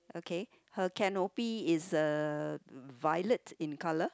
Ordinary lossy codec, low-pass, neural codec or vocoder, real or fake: none; none; none; real